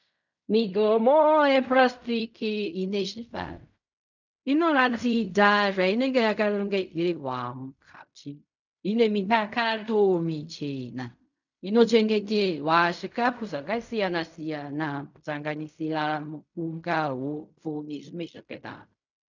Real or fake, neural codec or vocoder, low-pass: fake; codec, 16 kHz in and 24 kHz out, 0.4 kbps, LongCat-Audio-Codec, fine tuned four codebook decoder; 7.2 kHz